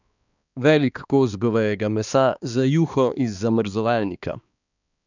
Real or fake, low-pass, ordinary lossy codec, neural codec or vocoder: fake; 7.2 kHz; none; codec, 16 kHz, 2 kbps, X-Codec, HuBERT features, trained on balanced general audio